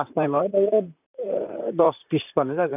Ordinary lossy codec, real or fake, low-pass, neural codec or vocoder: none; fake; 3.6 kHz; vocoder, 44.1 kHz, 128 mel bands, Pupu-Vocoder